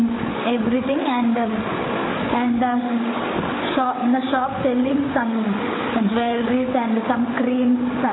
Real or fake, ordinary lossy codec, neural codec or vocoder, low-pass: fake; AAC, 16 kbps; codec, 16 kHz, 8 kbps, FreqCodec, larger model; 7.2 kHz